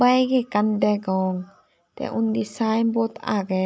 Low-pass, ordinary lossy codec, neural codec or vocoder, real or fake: none; none; none; real